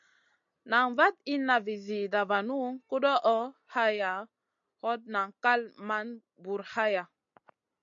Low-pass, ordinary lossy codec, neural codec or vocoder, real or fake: 7.2 kHz; MP3, 64 kbps; none; real